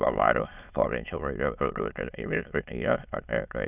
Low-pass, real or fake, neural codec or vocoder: 3.6 kHz; fake; autoencoder, 22.05 kHz, a latent of 192 numbers a frame, VITS, trained on many speakers